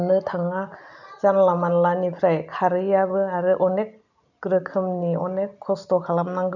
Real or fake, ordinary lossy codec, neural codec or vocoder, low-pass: real; none; none; 7.2 kHz